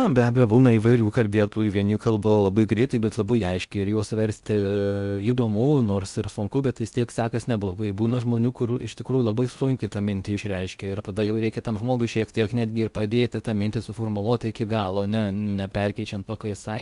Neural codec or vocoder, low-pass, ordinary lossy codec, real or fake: codec, 16 kHz in and 24 kHz out, 0.6 kbps, FocalCodec, streaming, 4096 codes; 10.8 kHz; Opus, 64 kbps; fake